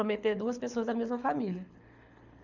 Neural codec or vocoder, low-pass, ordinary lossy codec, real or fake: codec, 24 kHz, 6 kbps, HILCodec; 7.2 kHz; none; fake